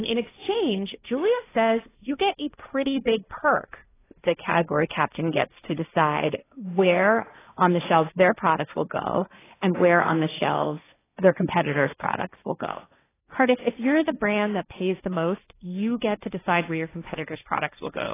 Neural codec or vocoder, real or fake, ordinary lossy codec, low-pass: codec, 16 kHz, 1.1 kbps, Voila-Tokenizer; fake; AAC, 16 kbps; 3.6 kHz